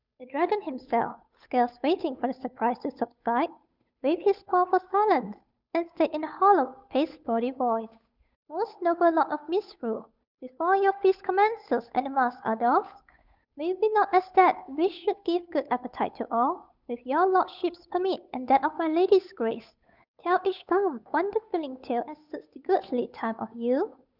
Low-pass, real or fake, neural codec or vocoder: 5.4 kHz; fake; codec, 16 kHz, 8 kbps, FunCodec, trained on Chinese and English, 25 frames a second